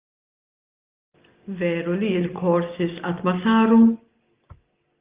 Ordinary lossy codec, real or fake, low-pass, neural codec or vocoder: Opus, 24 kbps; real; 3.6 kHz; none